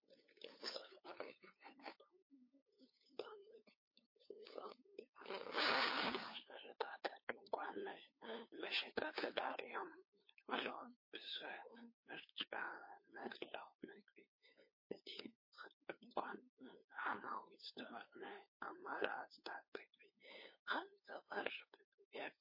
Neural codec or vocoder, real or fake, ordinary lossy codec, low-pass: codec, 16 kHz, 2 kbps, FunCodec, trained on LibriTTS, 25 frames a second; fake; MP3, 24 kbps; 5.4 kHz